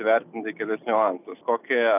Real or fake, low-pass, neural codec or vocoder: real; 3.6 kHz; none